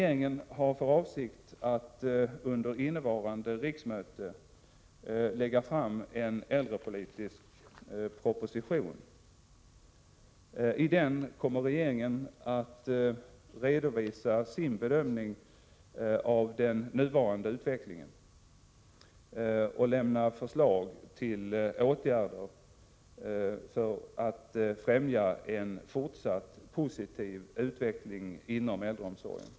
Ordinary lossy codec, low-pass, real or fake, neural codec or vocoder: none; none; real; none